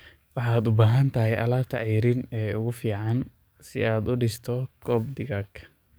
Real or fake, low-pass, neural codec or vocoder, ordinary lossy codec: fake; none; codec, 44.1 kHz, 7.8 kbps, DAC; none